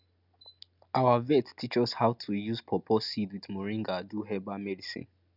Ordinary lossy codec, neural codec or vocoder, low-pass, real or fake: none; none; 5.4 kHz; real